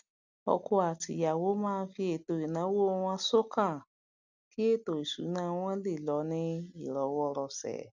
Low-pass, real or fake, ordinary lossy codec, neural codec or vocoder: 7.2 kHz; real; none; none